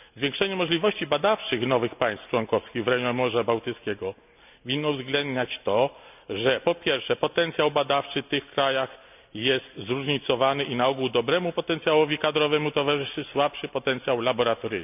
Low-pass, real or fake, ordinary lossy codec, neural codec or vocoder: 3.6 kHz; real; none; none